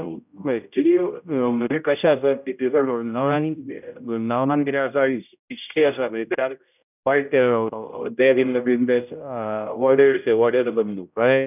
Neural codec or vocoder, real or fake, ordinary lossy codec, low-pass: codec, 16 kHz, 0.5 kbps, X-Codec, HuBERT features, trained on general audio; fake; none; 3.6 kHz